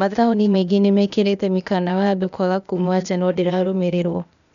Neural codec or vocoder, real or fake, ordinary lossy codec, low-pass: codec, 16 kHz, 0.8 kbps, ZipCodec; fake; none; 7.2 kHz